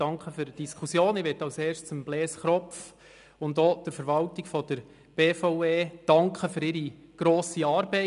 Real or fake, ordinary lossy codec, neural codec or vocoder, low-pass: real; none; none; 10.8 kHz